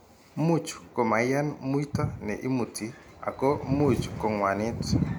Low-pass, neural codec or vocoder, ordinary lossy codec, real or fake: none; none; none; real